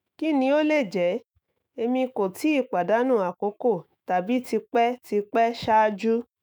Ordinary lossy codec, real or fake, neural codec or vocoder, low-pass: none; fake; autoencoder, 48 kHz, 128 numbers a frame, DAC-VAE, trained on Japanese speech; none